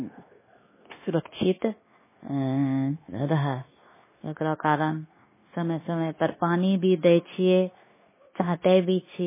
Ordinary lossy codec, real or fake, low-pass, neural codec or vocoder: MP3, 16 kbps; fake; 3.6 kHz; codec, 16 kHz, 0.9 kbps, LongCat-Audio-Codec